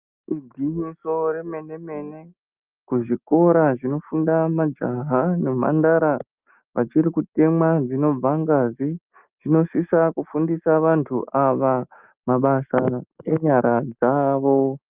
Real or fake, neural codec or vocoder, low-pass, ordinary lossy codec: real; none; 3.6 kHz; Opus, 32 kbps